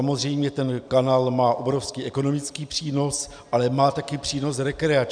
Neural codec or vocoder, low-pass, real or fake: none; 9.9 kHz; real